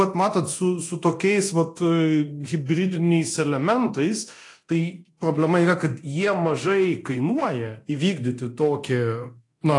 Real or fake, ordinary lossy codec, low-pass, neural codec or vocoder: fake; AAC, 48 kbps; 10.8 kHz; codec, 24 kHz, 0.9 kbps, DualCodec